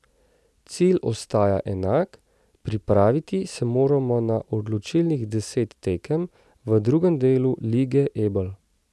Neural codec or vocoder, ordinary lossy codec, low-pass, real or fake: none; none; none; real